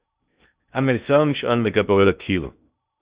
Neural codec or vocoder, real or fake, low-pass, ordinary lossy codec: codec, 16 kHz in and 24 kHz out, 0.6 kbps, FocalCodec, streaming, 2048 codes; fake; 3.6 kHz; Opus, 64 kbps